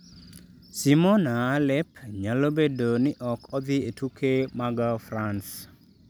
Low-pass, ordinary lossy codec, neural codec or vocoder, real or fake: none; none; none; real